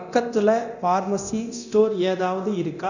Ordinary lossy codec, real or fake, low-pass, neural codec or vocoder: none; fake; 7.2 kHz; codec, 24 kHz, 0.9 kbps, DualCodec